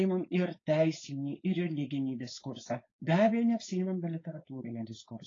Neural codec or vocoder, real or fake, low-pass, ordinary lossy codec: codec, 16 kHz, 4.8 kbps, FACodec; fake; 7.2 kHz; AAC, 32 kbps